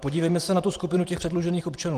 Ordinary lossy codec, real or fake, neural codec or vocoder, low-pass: Opus, 24 kbps; fake; vocoder, 44.1 kHz, 128 mel bands every 512 samples, BigVGAN v2; 14.4 kHz